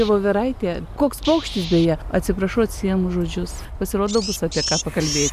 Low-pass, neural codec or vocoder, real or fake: 14.4 kHz; none; real